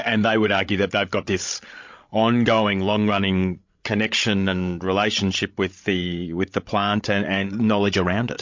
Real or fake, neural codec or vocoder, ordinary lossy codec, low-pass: fake; codec, 16 kHz, 16 kbps, FreqCodec, larger model; MP3, 48 kbps; 7.2 kHz